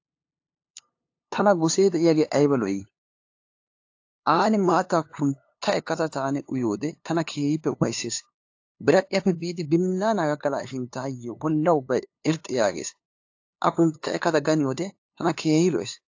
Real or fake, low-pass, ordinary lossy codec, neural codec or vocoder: fake; 7.2 kHz; AAC, 48 kbps; codec, 16 kHz, 2 kbps, FunCodec, trained on LibriTTS, 25 frames a second